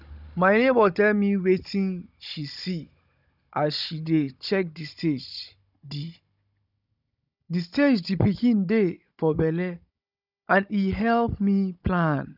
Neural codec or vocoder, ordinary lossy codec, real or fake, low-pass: codec, 16 kHz, 16 kbps, FunCodec, trained on Chinese and English, 50 frames a second; none; fake; 5.4 kHz